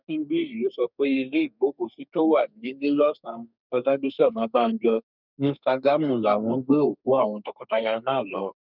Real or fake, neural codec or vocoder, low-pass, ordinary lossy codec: fake; codec, 32 kHz, 1.9 kbps, SNAC; 5.4 kHz; none